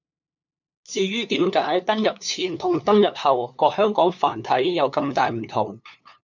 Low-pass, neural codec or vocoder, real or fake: 7.2 kHz; codec, 16 kHz, 2 kbps, FunCodec, trained on LibriTTS, 25 frames a second; fake